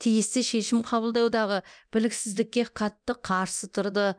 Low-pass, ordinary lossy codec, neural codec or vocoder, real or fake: 9.9 kHz; none; codec, 24 kHz, 0.9 kbps, DualCodec; fake